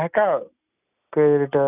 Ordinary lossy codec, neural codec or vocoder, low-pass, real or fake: none; none; 3.6 kHz; real